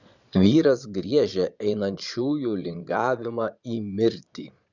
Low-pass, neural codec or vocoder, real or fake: 7.2 kHz; vocoder, 22.05 kHz, 80 mel bands, Vocos; fake